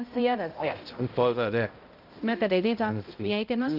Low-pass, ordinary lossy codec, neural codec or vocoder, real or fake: 5.4 kHz; Opus, 32 kbps; codec, 16 kHz, 0.5 kbps, X-Codec, HuBERT features, trained on balanced general audio; fake